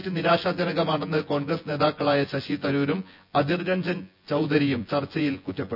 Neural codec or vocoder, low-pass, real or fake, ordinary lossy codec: vocoder, 24 kHz, 100 mel bands, Vocos; 5.4 kHz; fake; none